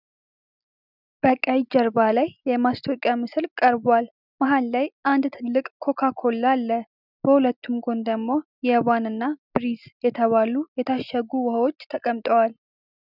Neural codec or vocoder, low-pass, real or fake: none; 5.4 kHz; real